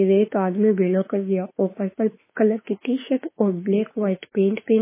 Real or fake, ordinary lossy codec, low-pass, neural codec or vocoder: fake; MP3, 16 kbps; 3.6 kHz; autoencoder, 48 kHz, 32 numbers a frame, DAC-VAE, trained on Japanese speech